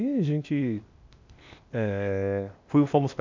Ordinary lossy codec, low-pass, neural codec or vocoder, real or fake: MP3, 64 kbps; 7.2 kHz; codec, 16 kHz, 0.8 kbps, ZipCodec; fake